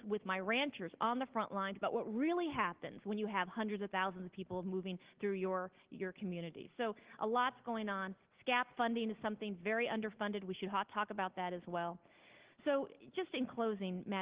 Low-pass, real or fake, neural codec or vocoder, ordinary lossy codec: 3.6 kHz; real; none; Opus, 16 kbps